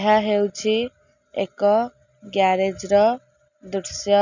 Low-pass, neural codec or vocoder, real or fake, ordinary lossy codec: 7.2 kHz; none; real; none